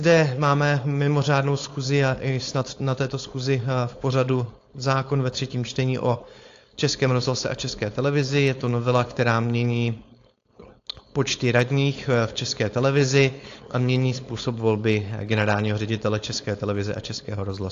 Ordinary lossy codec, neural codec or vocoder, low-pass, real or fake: AAC, 48 kbps; codec, 16 kHz, 4.8 kbps, FACodec; 7.2 kHz; fake